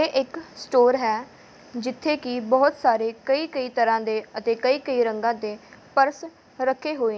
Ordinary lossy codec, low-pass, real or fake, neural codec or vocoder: none; none; real; none